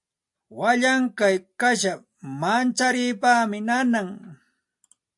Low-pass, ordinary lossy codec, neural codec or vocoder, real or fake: 10.8 kHz; AAC, 64 kbps; none; real